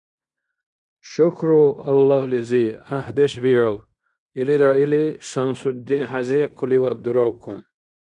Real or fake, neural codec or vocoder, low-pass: fake; codec, 16 kHz in and 24 kHz out, 0.9 kbps, LongCat-Audio-Codec, fine tuned four codebook decoder; 10.8 kHz